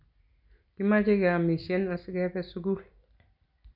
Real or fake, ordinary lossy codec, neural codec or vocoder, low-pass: real; none; none; 5.4 kHz